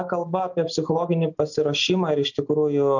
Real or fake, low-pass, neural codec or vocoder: real; 7.2 kHz; none